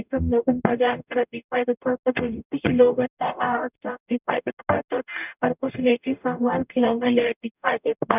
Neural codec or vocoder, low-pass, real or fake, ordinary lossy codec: codec, 44.1 kHz, 0.9 kbps, DAC; 3.6 kHz; fake; none